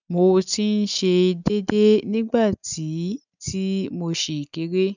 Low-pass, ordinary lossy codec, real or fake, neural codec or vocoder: 7.2 kHz; none; real; none